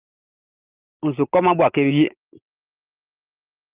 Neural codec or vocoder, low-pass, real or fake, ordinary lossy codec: none; 3.6 kHz; real; Opus, 32 kbps